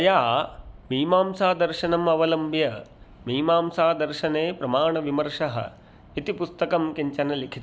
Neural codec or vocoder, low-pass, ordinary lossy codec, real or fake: none; none; none; real